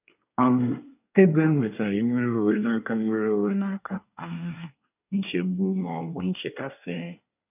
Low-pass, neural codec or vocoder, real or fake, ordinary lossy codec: 3.6 kHz; codec, 24 kHz, 1 kbps, SNAC; fake; none